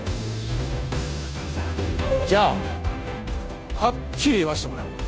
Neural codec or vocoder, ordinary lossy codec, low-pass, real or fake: codec, 16 kHz, 0.5 kbps, FunCodec, trained on Chinese and English, 25 frames a second; none; none; fake